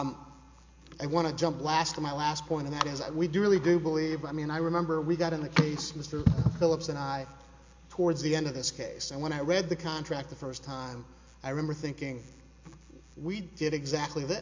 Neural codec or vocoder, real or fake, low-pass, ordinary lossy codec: none; real; 7.2 kHz; MP3, 48 kbps